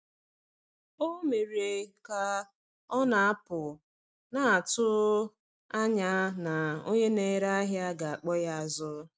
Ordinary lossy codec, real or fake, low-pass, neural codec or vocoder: none; real; none; none